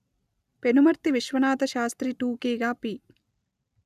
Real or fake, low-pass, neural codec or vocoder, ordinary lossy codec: real; 14.4 kHz; none; none